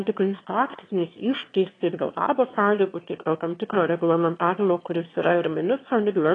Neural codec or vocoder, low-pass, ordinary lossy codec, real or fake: autoencoder, 22.05 kHz, a latent of 192 numbers a frame, VITS, trained on one speaker; 9.9 kHz; AAC, 32 kbps; fake